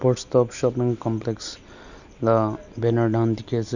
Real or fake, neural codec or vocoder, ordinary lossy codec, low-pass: real; none; none; 7.2 kHz